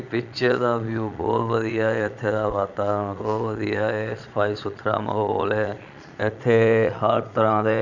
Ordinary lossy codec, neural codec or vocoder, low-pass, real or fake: none; vocoder, 22.05 kHz, 80 mel bands, Vocos; 7.2 kHz; fake